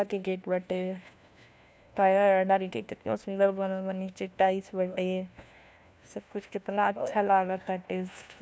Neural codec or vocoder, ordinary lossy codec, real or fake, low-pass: codec, 16 kHz, 1 kbps, FunCodec, trained on LibriTTS, 50 frames a second; none; fake; none